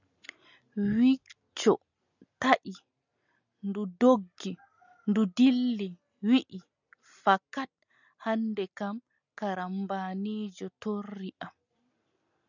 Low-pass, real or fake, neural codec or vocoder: 7.2 kHz; real; none